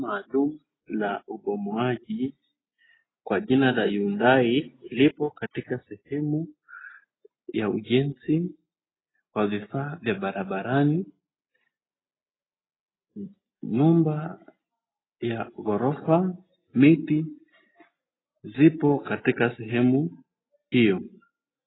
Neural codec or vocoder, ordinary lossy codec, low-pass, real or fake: none; AAC, 16 kbps; 7.2 kHz; real